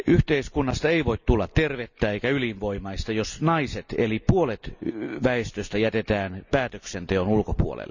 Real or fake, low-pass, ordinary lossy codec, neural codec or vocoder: real; 7.2 kHz; none; none